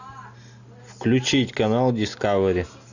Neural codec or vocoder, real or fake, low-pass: none; real; 7.2 kHz